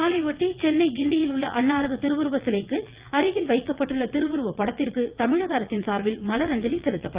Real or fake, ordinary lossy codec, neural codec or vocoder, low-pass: fake; Opus, 32 kbps; vocoder, 22.05 kHz, 80 mel bands, WaveNeXt; 3.6 kHz